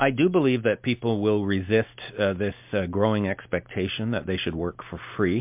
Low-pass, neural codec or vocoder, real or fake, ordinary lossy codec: 3.6 kHz; none; real; MP3, 32 kbps